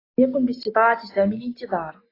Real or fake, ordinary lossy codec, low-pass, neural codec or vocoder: real; AAC, 24 kbps; 5.4 kHz; none